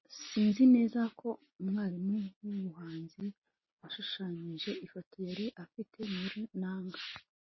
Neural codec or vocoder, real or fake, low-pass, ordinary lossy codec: none; real; 7.2 kHz; MP3, 24 kbps